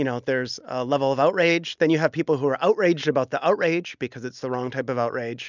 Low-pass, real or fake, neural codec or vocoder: 7.2 kHz; real; none